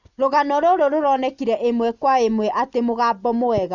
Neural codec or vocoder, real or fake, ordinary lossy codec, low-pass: none; real; Opus, 64 kbps; 7.2 kHz